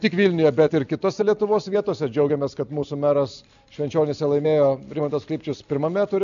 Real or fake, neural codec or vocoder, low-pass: real; none; 7.2 kHz